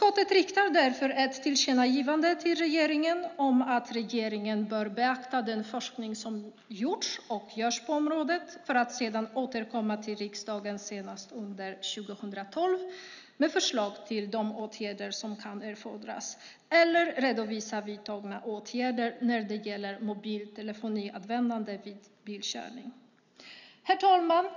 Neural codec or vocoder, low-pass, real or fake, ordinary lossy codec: none; 7.2 kHz; real; none